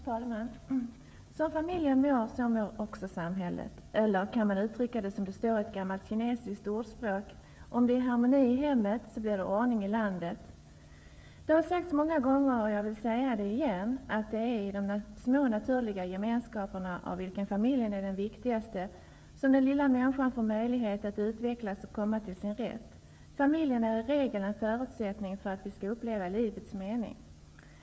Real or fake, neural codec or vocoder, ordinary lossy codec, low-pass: fake; codec, 16 kHz, 16 kbps, FreqCodec, smaller model; none; none